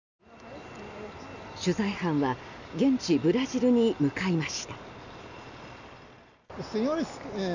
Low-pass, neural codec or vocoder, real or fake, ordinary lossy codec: 7.2 kHz; none; real; none